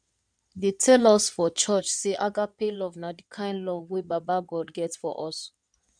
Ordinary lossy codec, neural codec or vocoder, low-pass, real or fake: none; codec, 16 kHz in and 24 kHz out, 2.2 kbps, FireRedTTS-2 codec; 9.9 kHz; fake